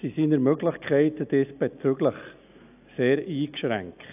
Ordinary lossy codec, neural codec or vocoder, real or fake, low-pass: AAC, 32 kbps; none; real; 3.6 kHz